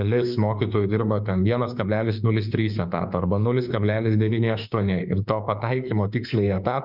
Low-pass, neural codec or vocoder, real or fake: 5.4 kHz; autoencoder, 48 kHz, 32 numbers a frame, DAC-VAE, trained on Japanese speech; fake